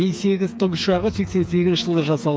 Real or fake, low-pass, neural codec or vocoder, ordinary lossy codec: fake; none; codec, 16 kHz, 4 kbps, FreqCodec, smaller model; none